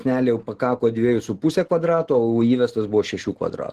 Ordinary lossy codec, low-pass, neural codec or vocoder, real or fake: Opus, 16 kbps; 14.4 kHz; none; real